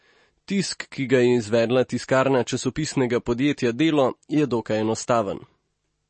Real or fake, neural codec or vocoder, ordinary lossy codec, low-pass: real; none; MP3, 32 kbps; 9.9 kHz